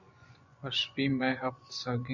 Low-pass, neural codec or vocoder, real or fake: 7.2 kHz; vocoder, 24 kHz, 100 mel bands, Vocos; fake